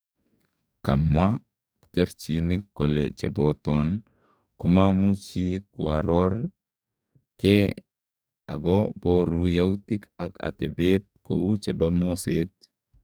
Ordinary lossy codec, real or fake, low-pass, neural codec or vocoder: none; fake; none; codec, 44.1 kHz, 2.6 kbps, DAC